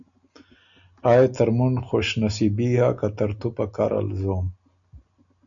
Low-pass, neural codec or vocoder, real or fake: 7.2 kHz; none; real